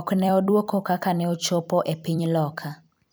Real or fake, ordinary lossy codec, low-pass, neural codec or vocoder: fake; none; none; vocoder, 44.1 kHz, 128 mel bands every 256 samples, BigVGAN v2